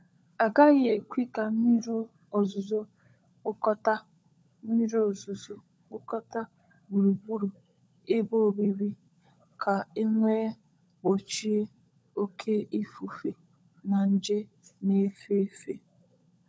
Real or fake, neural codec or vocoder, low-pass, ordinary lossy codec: fake; codec, 16 kHz, 16 kbps, FunCodec, trained on LibriTTS, 50 frames a second; none; none